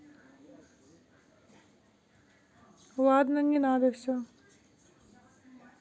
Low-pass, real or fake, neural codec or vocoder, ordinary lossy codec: none; real; none; none